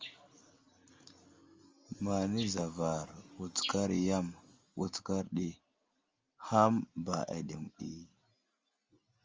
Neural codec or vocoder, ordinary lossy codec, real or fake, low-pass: none; Opus, 32 kbps; real; 7.2 kHz